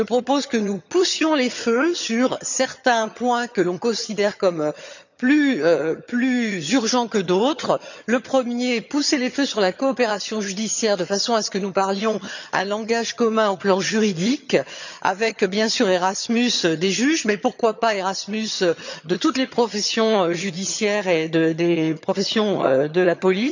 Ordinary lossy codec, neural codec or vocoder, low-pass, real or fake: none; vocoder, 22.05 kHz, 80 mel bands, HiFi-GAN; 7.2 kHz; fake